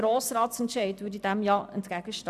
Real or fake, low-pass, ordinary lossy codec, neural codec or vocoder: real; 14.4 kHz; none; none